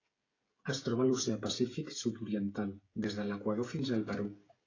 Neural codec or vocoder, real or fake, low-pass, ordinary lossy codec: codec, 16 kHz, 16 kbps, FreqCodec, smaller model; fake; 7.2 kHz; AAC, 32 kbps